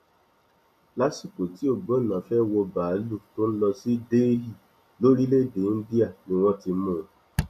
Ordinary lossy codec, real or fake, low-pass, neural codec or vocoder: none; real; 14.4 kHz; none